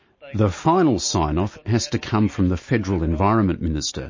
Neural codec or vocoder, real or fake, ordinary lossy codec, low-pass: none; real; MP3, 32 kbps; 7.2 kHz